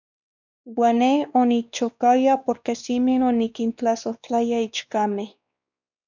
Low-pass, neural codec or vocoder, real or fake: 7.2 kHz; codec, 16 kHz, 2 kbps, X-Codec, WavLM features, trained on Multilingual LibriSpeech; fake